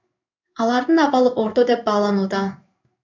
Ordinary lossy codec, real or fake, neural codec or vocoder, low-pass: MP3, 64 kbps; fake; codec, 16 kHz in and 24 kHz out, 1 kbps, XY-Tokenizer; 7.2 kHz